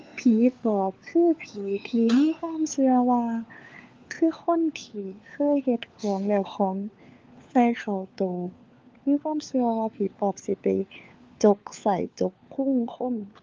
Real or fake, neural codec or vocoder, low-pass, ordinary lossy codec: fake; codec, 16 kHz, 4 kbps, FunCodec, trained on LibriTTS, 50 frames a second; 7.2 kHz; Opus, 24 kbps